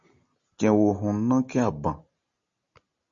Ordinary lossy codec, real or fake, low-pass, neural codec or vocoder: Opus, 64 kbps; real; 7.2 kHz; none